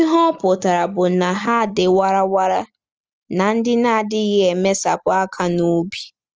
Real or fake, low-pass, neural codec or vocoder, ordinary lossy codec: real; 7.2 kHz; none; Opus, 24 kbps